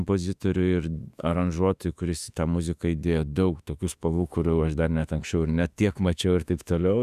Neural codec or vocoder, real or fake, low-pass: autoencoder, 48 kHz, 32 numbers a frame, DAC-VAE, trained on Japanese speech; fake; 14.4 kHz